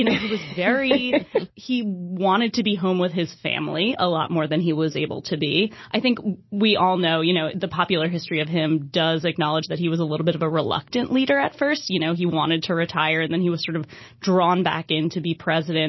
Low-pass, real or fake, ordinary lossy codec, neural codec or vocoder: 7.2 kHz; real; MP3, 24 kbps; none